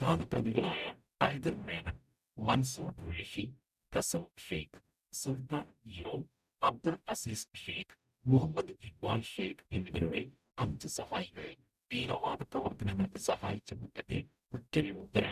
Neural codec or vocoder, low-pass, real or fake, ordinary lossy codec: codec, 44.1 kHz, 0.9 kbps, DAC; 14.4 kHz; fake; none